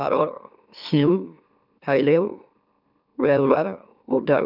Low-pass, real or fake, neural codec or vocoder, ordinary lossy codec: 5.4 kHz; fake; autoencoder, 44.1 kHz, a latent of 192 numbers a frame, MeloTTS; none